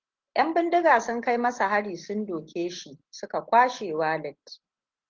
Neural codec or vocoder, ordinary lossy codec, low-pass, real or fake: none; Opus, 16 kbps; 7.2 kHz; real